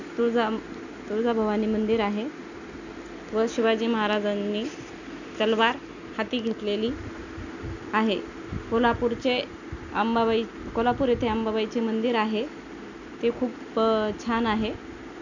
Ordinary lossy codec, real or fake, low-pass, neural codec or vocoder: none; real; 7.2 kHz; none